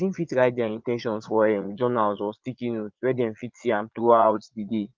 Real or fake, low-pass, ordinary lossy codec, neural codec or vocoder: fake; 7.2 kHz; Opus, 32 kbps; vocoder, 22.05 kHz, 80 mel bands, Vocos